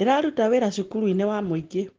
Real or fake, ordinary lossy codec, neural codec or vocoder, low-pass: real; Opus, 16 kbps; none; 7.2 kHz